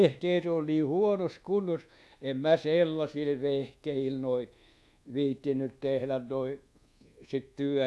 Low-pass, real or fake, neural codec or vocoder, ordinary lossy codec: none; fake; codec, 24 kHz, 1.2 kbps, DualCodec; none